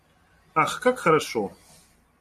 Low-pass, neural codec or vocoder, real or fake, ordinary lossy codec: 14.4 kHz; none; real; MP3, 64 kbps